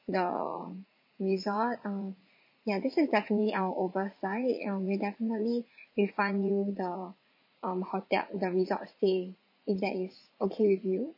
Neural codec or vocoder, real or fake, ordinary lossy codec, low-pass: vocoder, 44.1 kHz, 80 mel bands, Vocos; fake; MP3, 32 kbps; 5.4 kHz